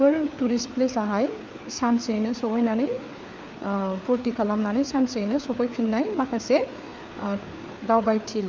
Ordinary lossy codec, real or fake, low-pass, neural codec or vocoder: none; fake; none; codec, 16 kHz, 4 kbps, FreqCodec, larger model